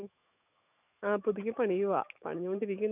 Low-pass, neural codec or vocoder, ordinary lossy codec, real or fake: 3.6 kHz; autoencoder, 48 kHz, 128 numbers a frame, DAC-VAE, trained on Japanese speech; none; fake